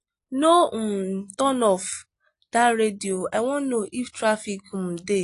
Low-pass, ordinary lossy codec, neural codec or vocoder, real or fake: 10.8 kHz; AAC, 48 kbps; none; real